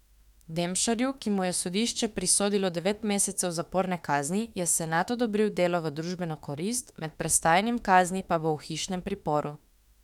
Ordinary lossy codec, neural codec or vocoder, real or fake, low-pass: none; autoencoder, 48 kHz, 32 numbers a frame, DAC-VAE, trained on Japanese speech; fake; 19.8 kHz